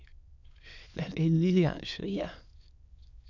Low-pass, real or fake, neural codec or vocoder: 7.2 kHz; fake; autoencoder, 22.05 kHz, a latent of 192 numbers a frame, VITS, trained on many speakers